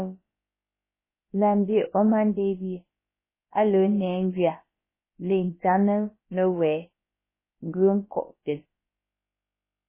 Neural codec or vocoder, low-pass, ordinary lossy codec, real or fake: codec, 16 kHz, about 1 kbps, DyCAST, with the encoder's durations; 3.6 kHz; MP3, 16 kbps; fake